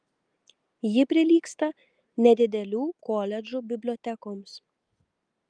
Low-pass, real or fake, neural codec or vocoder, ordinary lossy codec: 9.9 kHz; real; none; Opus, 32 kbps